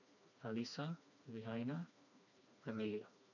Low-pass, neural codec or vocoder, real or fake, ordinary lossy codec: 7.2 kHz; codec, 16 kHz, 2 kbps, FreqCodec, smaller model; fake; none